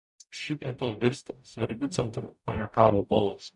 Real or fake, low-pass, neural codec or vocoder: fake; 10.8 kHz; codec, 44.1 kHz, 0.9 kbps, DAC